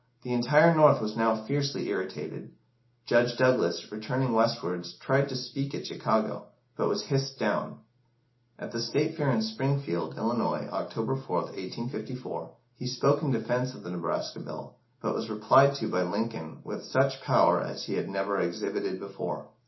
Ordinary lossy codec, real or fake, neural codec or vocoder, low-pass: MP3, 24 kbps; real; none; 7.2 kHz